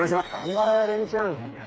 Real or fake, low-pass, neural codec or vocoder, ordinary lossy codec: fake; none; codec, 16 kHz, 4 kbps, FreqCodec, smaller model; none